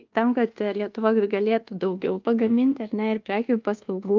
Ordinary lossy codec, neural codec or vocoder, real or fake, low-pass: Opus, 24 kbps; codec, 24 kHz, 1.2 kbps, DualCodec; fake; 7.2 kHz